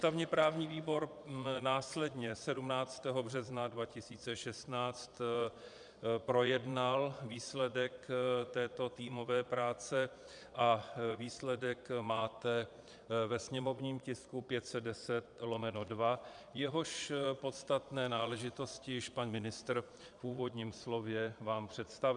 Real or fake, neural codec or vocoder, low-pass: fake; vocoder, 22.05 kHz, 80 mel bands, Vocos; 9.9 kHz